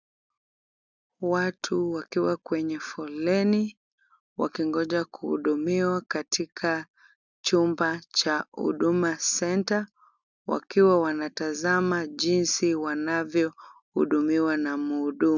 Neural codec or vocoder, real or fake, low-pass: none; real; 7.2 kHz